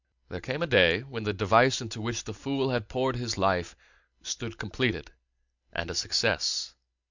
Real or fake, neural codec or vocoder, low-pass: real; none; 7.2 kHz